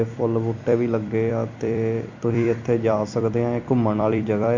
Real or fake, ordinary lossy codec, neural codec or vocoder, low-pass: fake; MP3, 48 kbps; vocoder, 44.1 kHz, 128 mel bands every 256 samples, BigVGAN v2; 7.2 kHz